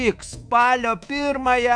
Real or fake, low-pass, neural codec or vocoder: fake; 9.9 kHz; codec, 24 kHz, 3.1 kbps, DualCodec